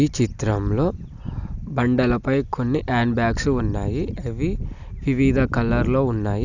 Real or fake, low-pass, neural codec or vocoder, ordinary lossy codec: real; 7.2 kHz; none; none